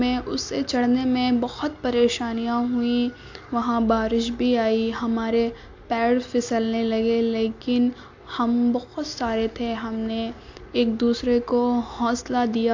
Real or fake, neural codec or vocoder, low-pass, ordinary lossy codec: real; none; 7.2 kHz; AAC, 48 kbps